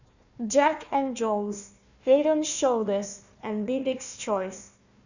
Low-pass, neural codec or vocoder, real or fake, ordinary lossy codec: 7.2 kHz; codec, 16 kHz, 1 kbps, FunCodec, trained on Chinese and English, 50 frames a second; fake; none